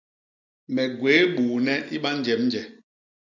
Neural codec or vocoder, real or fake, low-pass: none; real; 7.2 kHz